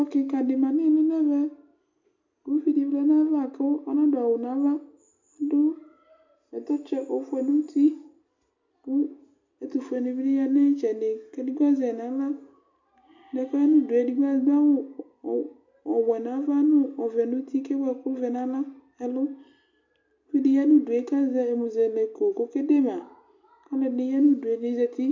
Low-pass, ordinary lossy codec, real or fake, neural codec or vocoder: 7.2 kHz; MP3, 48 kbps; real; none